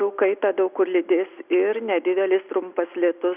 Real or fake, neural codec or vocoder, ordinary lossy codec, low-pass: real; none; Opus, 64 kbps; 3.6 kHz